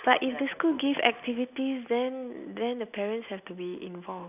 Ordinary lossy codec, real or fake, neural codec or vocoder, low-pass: none; real; none; 3.6 kHz